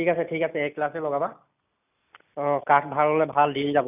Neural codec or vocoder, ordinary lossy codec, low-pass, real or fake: none; none; 3.6 kHz; real